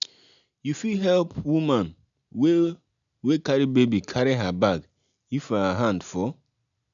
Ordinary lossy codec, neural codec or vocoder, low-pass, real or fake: none; none; 7.2 kHz; real